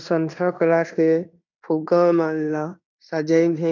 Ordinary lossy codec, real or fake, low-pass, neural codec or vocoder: none; fake; 7.2 kHz; codec, 16 kHz in and 24 kHz out, 0.9 kbps, LongCat-Audio-Codec, fine tuned four codebook decoder